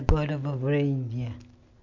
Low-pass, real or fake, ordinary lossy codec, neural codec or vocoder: 7.2 kHz; real; MP3, 64 kbps; none